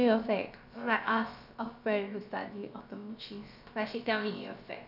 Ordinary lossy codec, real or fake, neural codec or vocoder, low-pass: none; fake; codec, 16 kHz, about 1 kbps, DyCAST, with the encoder's durations; 5.4 kHz